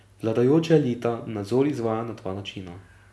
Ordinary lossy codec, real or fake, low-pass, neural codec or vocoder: none; real; none; none